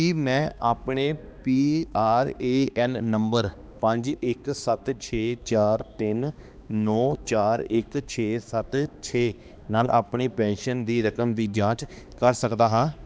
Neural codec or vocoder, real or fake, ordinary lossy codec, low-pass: codec, 16 kHz, 2 kbps, X-Codec, HuBERT features, trained on balanced general audio; fake; none; none